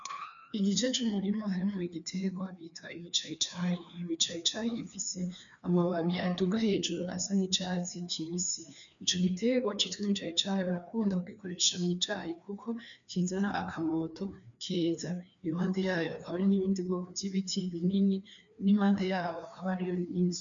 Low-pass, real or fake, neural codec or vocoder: 7.2 kHz; fake; codec, 16 kHz, 2 kbps, FreqCodec, larger model